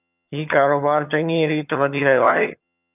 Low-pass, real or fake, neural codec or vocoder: 3.6 kHz; fake; vocoder, 22.05 kHz, 80 mel bands, HiFi-GAN